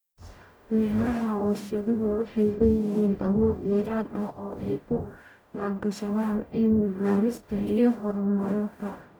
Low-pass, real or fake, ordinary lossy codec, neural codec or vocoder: none; fake; none; codec, 44.1 kHz, 0.9 kbps, DAC